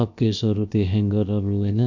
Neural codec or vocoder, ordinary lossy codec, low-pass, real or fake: codec, 16 kHz, about 1 kbps, DyCAST, with the encoder's durations; none; 7.2 kHz; fake